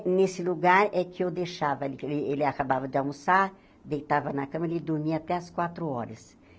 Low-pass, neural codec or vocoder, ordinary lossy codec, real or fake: none; none; none; real